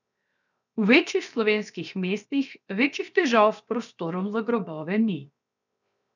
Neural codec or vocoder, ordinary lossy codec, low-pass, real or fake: codec, 16 kHz, 0.7 kbps, FocalCodec; none; 7.2 kHz; fake